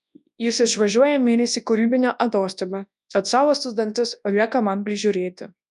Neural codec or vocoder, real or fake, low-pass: codec, 24 kHz, 0.9 kbps, WavTokenizer, large speech release; fake; 10.8 kHz